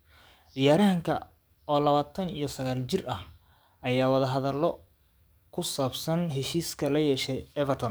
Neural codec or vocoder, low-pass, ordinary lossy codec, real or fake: codec, 44.1 kHz, 7.8 kbps, DAC; none; none; fake